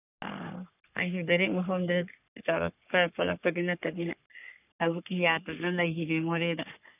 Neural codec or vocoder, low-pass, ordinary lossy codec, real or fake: codec, 44.1 kHz, 3.4 kbps, Pupu-Codec; 3.6 kHz; none; fake